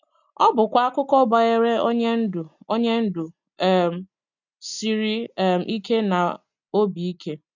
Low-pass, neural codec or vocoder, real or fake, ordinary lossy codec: 7.2 kHz; none; real; none